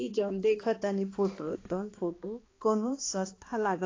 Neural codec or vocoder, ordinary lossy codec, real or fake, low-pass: codec, 16 kHz, 2 kbps, X-Codec, HuBERT features, trained on balanced general audio; AAC, 32 kbps; fake; 7.2 kHz